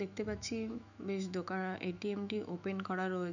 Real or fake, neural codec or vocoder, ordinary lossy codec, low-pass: fake; autoencoder, 48 kHz, 128 numbers a frame, DAC-VAE, trained on Japanese speech; none; 7.2 kHz